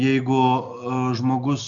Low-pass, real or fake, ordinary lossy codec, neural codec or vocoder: 7.2 kHz; real; MP3, 64 kbps; none